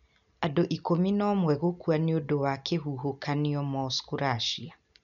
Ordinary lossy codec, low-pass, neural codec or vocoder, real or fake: none; 7.2 kHz; none; real